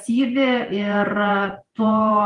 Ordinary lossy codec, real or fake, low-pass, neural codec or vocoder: Opus, 24 kbps; fake; 10.8 kHz; vocoder, 48 kHz, 128 mel bands, Vocos